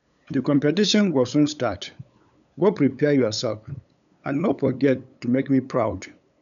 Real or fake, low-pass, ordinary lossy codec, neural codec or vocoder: fake; 7.2 kHz; none; codec, 16 kHz, 8 kbps, FunCodec, trained on LibriTTS, 25 frames a second